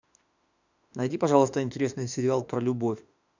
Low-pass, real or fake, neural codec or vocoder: 7.2 kHz; fake; autoencoder, 48 kHz, 32 numbers a frame, DAC-VAE, trained on Japanese speech